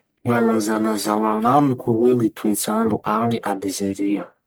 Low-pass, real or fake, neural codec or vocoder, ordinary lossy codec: none; fake; codec, 44.1 kHz, 1.7 kbps, Pupu-Codec; none